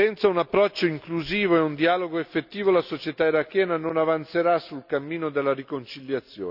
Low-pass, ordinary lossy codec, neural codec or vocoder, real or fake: 5.4 kHz; none; none; real